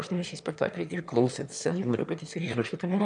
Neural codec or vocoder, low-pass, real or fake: autoencoder, 22.05 kHz, a latent of 192 numbers a frame, VITS, trained on one speaker; 9.9 kHz; fake